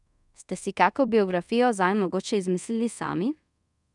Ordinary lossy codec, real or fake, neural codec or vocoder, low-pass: none; fake; codec, 24 kHz, 0.5 kbps, DualCodec; 10.8 kHz